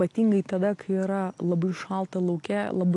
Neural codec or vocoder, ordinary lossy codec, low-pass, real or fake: none; AAC, 48 kbps; 10.8 kHz; real